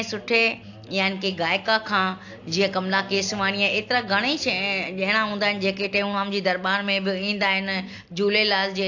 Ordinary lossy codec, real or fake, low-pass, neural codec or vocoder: AAC, 48 kbps; real; 7.2 kHz; none